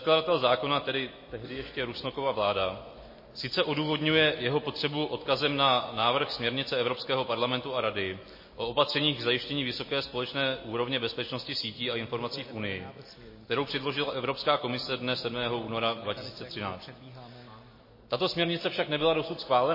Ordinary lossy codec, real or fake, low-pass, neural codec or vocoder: MP3, 24 kbps; real; 5.4 kHz; none